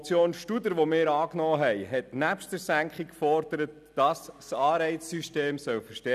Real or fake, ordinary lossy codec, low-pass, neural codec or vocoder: real; none; 14.4 kHz; none